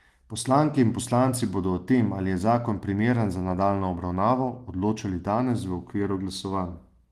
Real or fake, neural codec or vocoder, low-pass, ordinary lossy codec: real; none; 14.4 kHz; Opus, 24 kbps